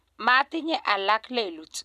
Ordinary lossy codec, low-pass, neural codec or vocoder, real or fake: none; 14.4 kHz; none; real